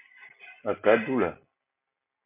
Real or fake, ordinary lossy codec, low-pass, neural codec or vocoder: real; MP3, 32 kbps; 3.6 kHz; none